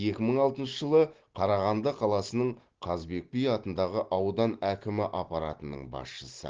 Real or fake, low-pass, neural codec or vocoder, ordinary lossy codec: real; 7.2 kHz; none; Opus, 16 kbps